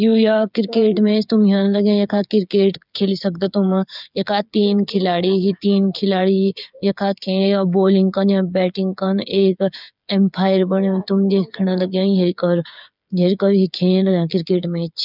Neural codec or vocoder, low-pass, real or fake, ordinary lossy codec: codec, 16 kHz, 8 kbps, FreqCodec, smaller model; 5.4 kHz; fake; none